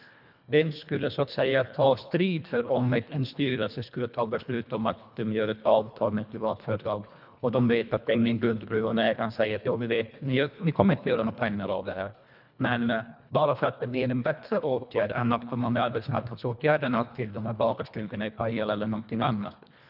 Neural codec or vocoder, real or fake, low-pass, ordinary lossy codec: codec, 24 kHz, 1.5 kbps, HILCodec; fake; 5.4 kHz; none